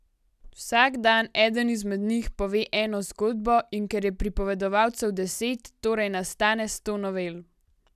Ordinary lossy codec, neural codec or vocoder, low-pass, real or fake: none; none; 14.4 kHz; real